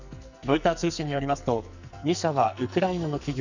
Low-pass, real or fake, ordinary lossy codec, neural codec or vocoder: 7.2 kHz; fake; none; codec, 44.1 kHz, 2.6 kbps, SNAC